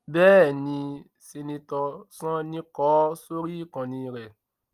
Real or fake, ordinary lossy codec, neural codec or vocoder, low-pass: real; Opus, 32 kbps; none; 14.4 kHz